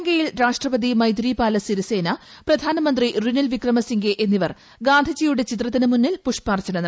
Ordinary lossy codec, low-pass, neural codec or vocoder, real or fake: none; none; none; real